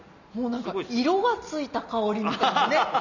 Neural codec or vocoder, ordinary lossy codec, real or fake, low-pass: none; none; real; 7.2 kHz